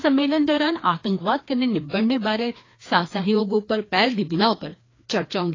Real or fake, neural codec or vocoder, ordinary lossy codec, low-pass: fake; codec, 16 kHz, 2 kbps, FreqCodec, larger model; AAC, 32 kbps; 7.2 kHz